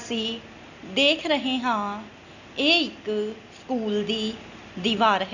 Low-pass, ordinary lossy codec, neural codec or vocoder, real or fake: 7.2 kHz; none; none; real